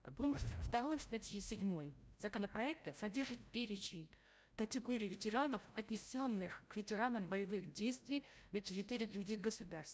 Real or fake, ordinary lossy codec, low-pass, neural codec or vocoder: fake; none; none; codec, 16 kHz, 0.5 kbps, FreqCodec, larger model